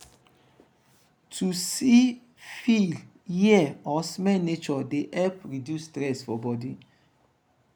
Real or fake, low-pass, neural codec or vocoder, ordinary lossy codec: real; 19.8 kHz; none; none